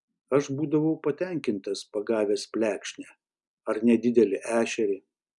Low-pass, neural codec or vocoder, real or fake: 10.8 kHz; none; real